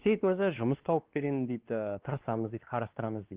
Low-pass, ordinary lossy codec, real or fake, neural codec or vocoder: 3.6 kHz; Opus, 16 kbps; fake; codec, 16 kHz, 2 kbps, X-Codec, WavLM features, trained on Multilingual LibriSpeech